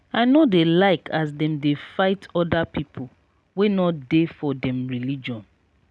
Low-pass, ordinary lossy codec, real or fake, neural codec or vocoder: none; none; real; none